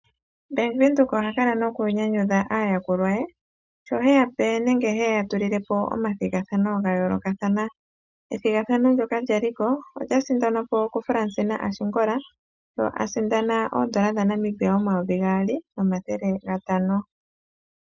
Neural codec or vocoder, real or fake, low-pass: none; real; 7.2 kHz